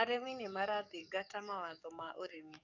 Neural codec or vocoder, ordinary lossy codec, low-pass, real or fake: codec, 44.1 kHz, 7.8 kbps, DAC; none; 7.2 kHz; fake